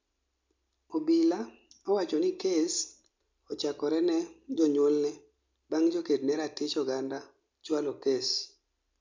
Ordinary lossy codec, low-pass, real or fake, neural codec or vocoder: MP3, 64 kbps; 7.2 kHz; real; none